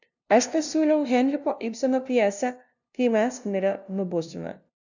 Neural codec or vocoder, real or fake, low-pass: codec, 16 kHz, 0.5 kbps, FunCodec, trained on LibriTTS, 25 frames a second; fake; 7.2 kHz